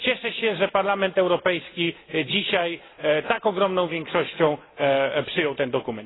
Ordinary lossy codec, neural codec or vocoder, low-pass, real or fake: AAC, 16 kbps; none; 7.2 kHz; real